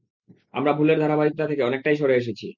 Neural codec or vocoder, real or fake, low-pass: none; real; 7.2 kHz